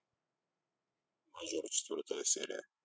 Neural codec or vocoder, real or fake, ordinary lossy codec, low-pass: codec, 16 kHz, 16 kbps, FreqCodec, larger model; fake; none; none